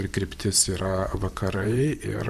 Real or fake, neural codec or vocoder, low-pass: fake; vocoder, 44.1 kHz, 128 mel bands, Pupu-Vocoder; 14.4 kHz